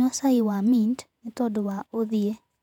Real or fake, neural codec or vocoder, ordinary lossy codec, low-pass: fake; vocoder, 48 kHz, 128 mel bands, Vocos; none; 19.8 kHz